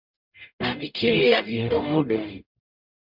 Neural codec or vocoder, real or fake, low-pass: codec, 44.1 kHz, 0.9 kbps, DAC; fake; 5.4 kHz